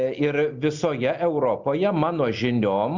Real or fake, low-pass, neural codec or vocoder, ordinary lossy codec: real; 7.2 kHz; none; Opus, 64 kbps